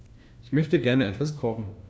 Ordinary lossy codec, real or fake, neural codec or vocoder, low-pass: none; fake; codec, 16 kHz, 1 kbps, FunCodec, trained on LibriTTS, 50 frames a second; none